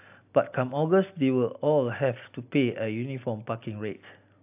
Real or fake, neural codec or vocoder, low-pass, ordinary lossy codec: real; none; 3.6 kHz; AAC, 32 kbps